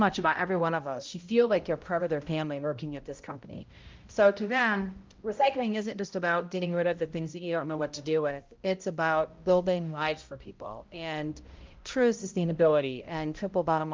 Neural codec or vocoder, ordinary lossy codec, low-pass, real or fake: codec, 16 kHz, 0.5 kbps, X-Codec, HuBERT features, trained on balanced general audio; Opus, 24 kbps; 7.2 kHz; fake